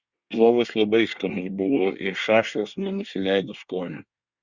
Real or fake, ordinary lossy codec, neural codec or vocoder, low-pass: fake; Opus, 64 kbps; codec, 24 kHz, 1 kbps, SNAC; 7.2 kHz